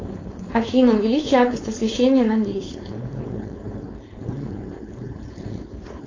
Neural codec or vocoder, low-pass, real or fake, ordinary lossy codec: codec, 16 kHz, 4.8 kbps, FACodec; 7.2 kHz; fake; AAC, 32 kbps